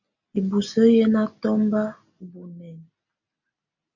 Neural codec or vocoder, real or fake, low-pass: none; real; 7.2 kHz